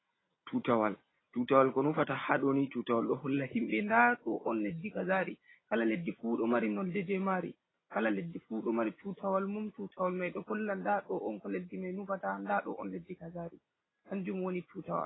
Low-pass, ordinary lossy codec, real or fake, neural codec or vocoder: 7.2 kHz; AAC, 16 kbps; real; none